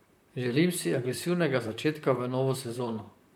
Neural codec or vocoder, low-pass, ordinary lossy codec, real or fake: vocoder, 44.1 kHz, 128 mel bands, Pupu-Vocoder; none; none; fake